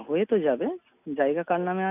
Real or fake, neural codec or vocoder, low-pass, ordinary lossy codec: real; none; 3.6 kHz; none